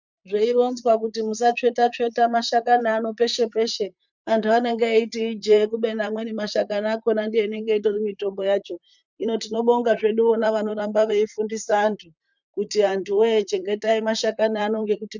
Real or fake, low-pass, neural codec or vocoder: fake; 7.2 kHz; vocoder, 44.1 kHz, 128 mel bands, Pupu-Vocoder